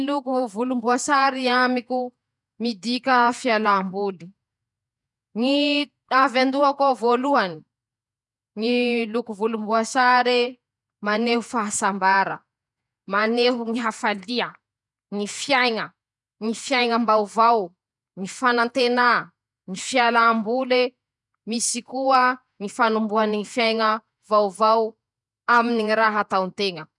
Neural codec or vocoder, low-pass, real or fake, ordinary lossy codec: vocoder, 48 kHz, 128 mel bands, Vocos; 10.8 kHz; fake; none